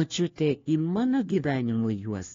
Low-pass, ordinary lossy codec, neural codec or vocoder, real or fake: 7.2 kHz; AAC, 32 kbps; codec, 16 kHz, 1 kbps, FunCodec, trained on LibriTTS, 50 frames a second; fake